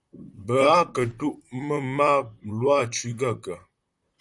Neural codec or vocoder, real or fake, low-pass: vocoder, 44.1 kHz, 128 mel bands, Pupu-Vocoder; fake; 10.8 kHz